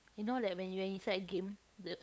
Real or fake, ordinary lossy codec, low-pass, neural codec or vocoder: fake; none; none; codec, 16 kHz, 8 kbps, FunCodec, trained on LibriTTS, 25 frames a second